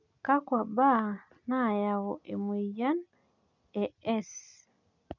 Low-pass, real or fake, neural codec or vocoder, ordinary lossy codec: 7.2 kHz; real; none; none